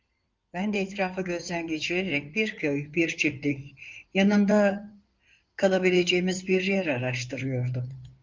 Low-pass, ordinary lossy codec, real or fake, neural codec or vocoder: 7.2 kHz; Opus, 24 kbps; fake; codec, 16 kHz in and 24 kHz out, 2.2 kbps, FireRedTTS-2 codec